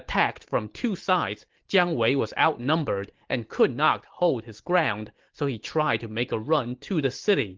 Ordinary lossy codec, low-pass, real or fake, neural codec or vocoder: Opus, 16 kbps; 7.2 kHz; real; none